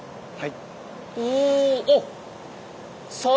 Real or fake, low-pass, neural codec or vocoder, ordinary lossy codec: real; none; none; none